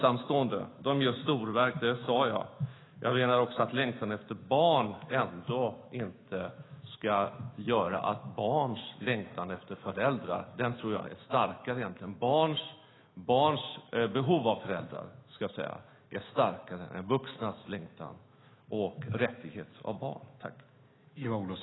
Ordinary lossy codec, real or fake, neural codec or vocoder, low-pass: AAC, 16 kbps; fake; autoencoder, 48 kHz, 128 numbers a frame, DAC-VAE, trained on Japanese speech; 7.2 kHz